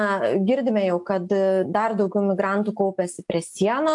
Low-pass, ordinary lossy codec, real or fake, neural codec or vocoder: 10.8 kHz; AAC, 64 kbps; real; none